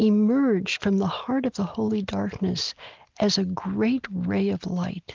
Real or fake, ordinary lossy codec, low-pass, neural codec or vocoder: real; Opus, 32 kbps; 7.2 kHz; none